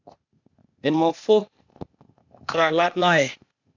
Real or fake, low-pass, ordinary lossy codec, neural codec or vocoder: fake; 7.2 kHz; MP3, 64 kbps; codec, 16 kHz, 0.8 kbps, ZipCodec